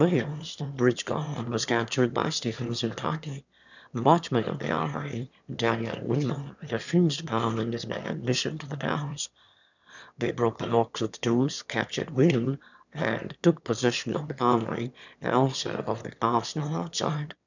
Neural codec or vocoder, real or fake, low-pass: autoencoder, 22.05 kHz, a latent of 192 numbers a frame, VITS, trained on one speaker; fake; 7.2 kHz